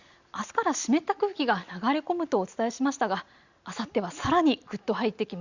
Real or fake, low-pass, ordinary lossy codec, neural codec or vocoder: real; 7.2 kHz; Opus, 64 kbps; none